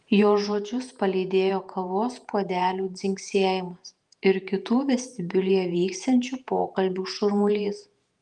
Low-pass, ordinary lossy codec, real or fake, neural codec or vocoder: 10.8 kHz; Opus, 32 kbps; fake; vocoder, 48 kHz, 128 mel bands, Vocos